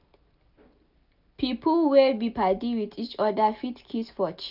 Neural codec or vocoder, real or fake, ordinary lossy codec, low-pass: none; real; none; 5.4 kHz